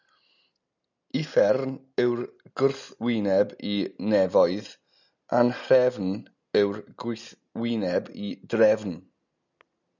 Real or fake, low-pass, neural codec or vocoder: real; 7.2 kHz; none